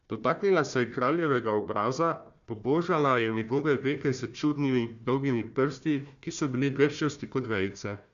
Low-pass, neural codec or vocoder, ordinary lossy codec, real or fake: 7.2 kHz; codec, 16 kHz, 1 kbps, FunCodec, trained on Chinese and English, 50 frames a second; MP3, 96 kbps; fake